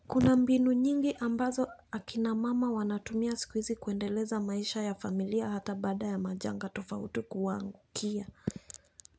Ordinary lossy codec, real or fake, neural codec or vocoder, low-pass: none; real; none; none